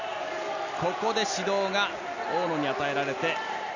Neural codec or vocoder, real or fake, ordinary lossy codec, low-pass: none; real; none; 7.2 kHz